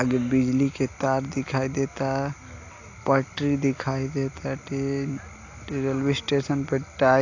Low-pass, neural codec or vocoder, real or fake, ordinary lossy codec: 7.2 kHz; none; real; AAC, 48 kbps